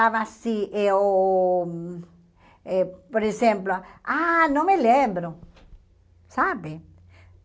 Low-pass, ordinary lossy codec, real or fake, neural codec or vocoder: none; none; real; none